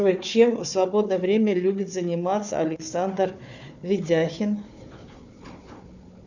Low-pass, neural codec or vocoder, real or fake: 7.2 kHz; codec, 16 kHz, 4 kbps, FunCodec, trained on Chinese and English, 50 frames a second; fake